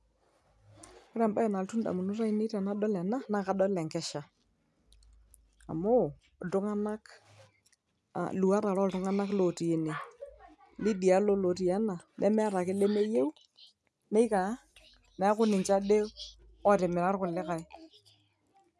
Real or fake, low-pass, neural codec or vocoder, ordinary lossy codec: real; none; none; none